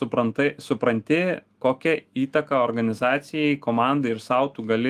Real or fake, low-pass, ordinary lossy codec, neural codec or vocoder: fake; 14.4 kHz; Opus, 24 kbps; autoencoder, 48 kHz, 128 numbers a frame, DAC-VAE, trained on Japanese speech